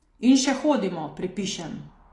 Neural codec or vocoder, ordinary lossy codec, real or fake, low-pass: none; AAC, 32 kbps; real; 10.8 kHz